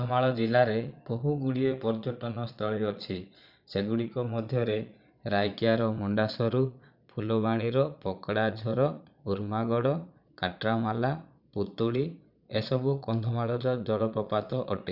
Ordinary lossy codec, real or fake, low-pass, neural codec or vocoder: none; fake; 5.4 kHz; vocoder, 22.05 kHz, 80 mel bands, Vocos